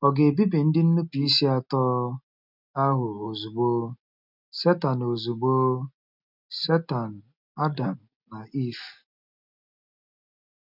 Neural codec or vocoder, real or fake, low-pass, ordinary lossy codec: none; real; 5.4 kHz; none